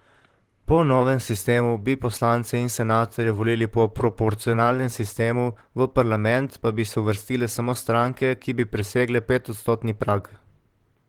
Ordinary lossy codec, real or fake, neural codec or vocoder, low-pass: Opus, 16 kbps; fake; vocoder, 44.1 kHz, 128 mel bands, Pupu-Vocoder; 19.8 kHz